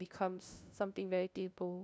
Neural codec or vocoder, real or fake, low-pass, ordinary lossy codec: codec, 16 kHz, 0.5 kbps, FunCodec, trained on LibriTTS, 25 frames a second; fake; none; none